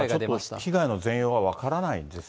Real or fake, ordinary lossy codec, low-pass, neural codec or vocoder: real; none; none; none